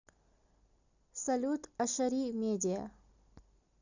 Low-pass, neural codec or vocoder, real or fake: 7.2 kHz; none; real